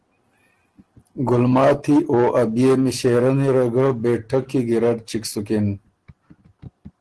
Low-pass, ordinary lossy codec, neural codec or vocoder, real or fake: 10.8 kHz; Opus, 16 kbps; none; real